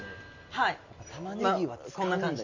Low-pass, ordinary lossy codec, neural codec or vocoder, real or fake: 7.2 kHz; MP3, 64 kbps; none; real